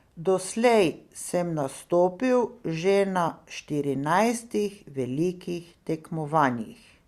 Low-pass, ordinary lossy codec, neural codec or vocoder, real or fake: 14.4 kHz; Opus, 64 kbps; none; real